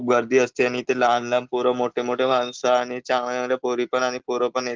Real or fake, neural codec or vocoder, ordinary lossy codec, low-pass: real; none; Opus, 16 kbps; 7.2 kHz